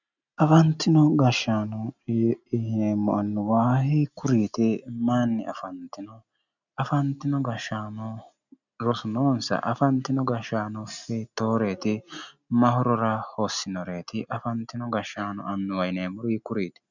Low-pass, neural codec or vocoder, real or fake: 7.2 kHz; none; real